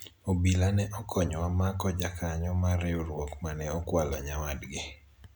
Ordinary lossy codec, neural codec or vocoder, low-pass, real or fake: none; none; none; real